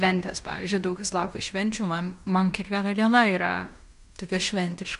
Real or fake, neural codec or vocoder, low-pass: fake; codec, 16 kHz in and 24 kHz out, 0.9 kbps, LongCat-Audio-Codec, fine tuned four codebook decoder; 10.8 kHz